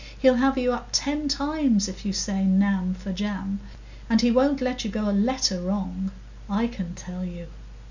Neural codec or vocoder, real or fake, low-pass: none; real; 7.2 kHz